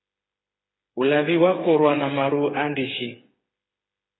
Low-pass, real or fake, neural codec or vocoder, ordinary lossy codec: 7.2 kHz; fake; codec, 16 kHz, 8 kbps, FreqCodec, smaller model; AAC, 16 kbps